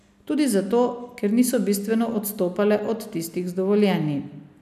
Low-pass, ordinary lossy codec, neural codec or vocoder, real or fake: 14.4 kHz; none; none; real